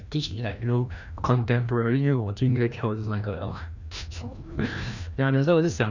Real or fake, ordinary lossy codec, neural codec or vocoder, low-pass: fake; none; codec, 16 kHz, 1 kbps, FreqCodec, larger model; 7.2 kHz